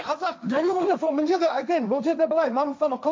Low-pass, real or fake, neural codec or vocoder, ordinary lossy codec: none; fake; codec, 16 kHz, 1.1 kbps, Voila-Tokenizer; none